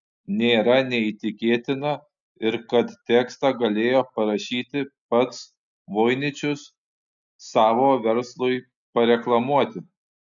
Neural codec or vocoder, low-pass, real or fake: none; 7.2 kHz; real